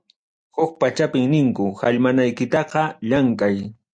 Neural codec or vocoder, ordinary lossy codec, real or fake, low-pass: none; MP3, 96 kbps; real; 9.9 kHz